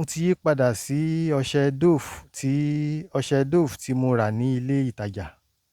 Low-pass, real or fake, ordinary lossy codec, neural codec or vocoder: 19.8 kHz; real; Opus, 64 kbps; none